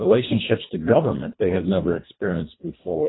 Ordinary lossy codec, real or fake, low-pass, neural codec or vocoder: AAC, 16 kbps; fake; 7.2 kHz; codec, 24 kHz, 1.5 kbps, HILCodec